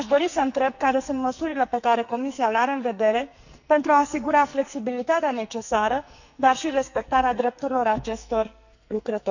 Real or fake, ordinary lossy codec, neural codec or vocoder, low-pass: fake; none; codec, 44.1 kHz, 2.6 kbps, SNAC; 7.2 kHz